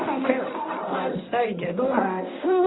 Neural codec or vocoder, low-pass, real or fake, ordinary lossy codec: codec, 24 kHz, 0.9 kbps, WavTokenizer, medium speech release version 1; 7.2 kHz; fake; AAC, 16 kbps